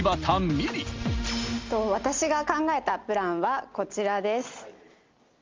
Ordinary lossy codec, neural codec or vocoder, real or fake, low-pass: Opus, 32 kbps; none; real; 7.2 kHz